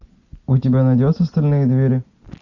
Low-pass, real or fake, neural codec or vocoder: 7.2 kHz; real; none